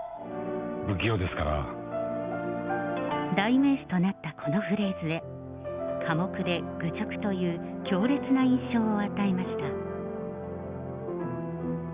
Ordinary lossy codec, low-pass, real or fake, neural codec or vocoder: Opus, 64 kbps; 3.6 kHz; real; none